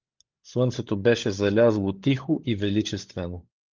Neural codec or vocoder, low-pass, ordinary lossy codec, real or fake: codec, 16 kHz, 16 kbps, FunCodec, trained on LibriTTS, 50 frames a second; 7.2 kHz; Opus, 32 kbps; fake